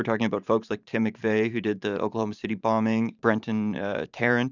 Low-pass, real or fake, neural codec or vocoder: 7.2 kHz; fake; vocoder, 44.1 kHz, 128 mel bands every 512 samples, BigVGAN v2